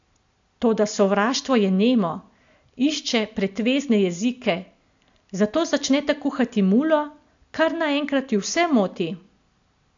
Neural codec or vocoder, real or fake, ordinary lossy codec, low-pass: none; real; AAC, 64 kbps; 7.2 kHz